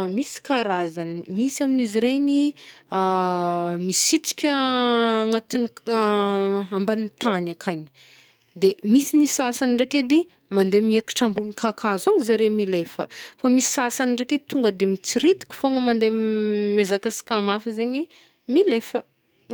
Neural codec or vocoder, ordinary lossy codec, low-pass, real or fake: codec, 44.1 kHz, 2.6 kbps, SNAC; none; none; fake